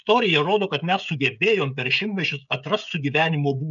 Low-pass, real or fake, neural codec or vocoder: 7.2 kHz; fake; codec, 16 kHz, 16 kbps, FreqCodec, smaller model